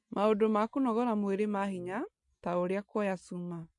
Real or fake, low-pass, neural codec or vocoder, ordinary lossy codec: real; 10.8 kHz; none; MP3, 48 kbps